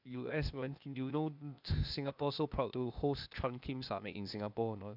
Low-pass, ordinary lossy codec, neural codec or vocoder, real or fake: 5.4 kHz; none; codec, 16 kHz, 0.8 kbps, ZipCodec; fake